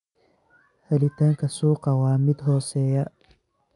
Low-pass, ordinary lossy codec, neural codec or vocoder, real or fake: 10.8 kHz; none; none; real